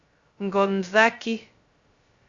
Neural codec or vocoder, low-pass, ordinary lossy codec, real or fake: codec, 16 kHz, 0.2 kbps, FocalCodec; 7.2 kHz; Opus, 64 kbps; fake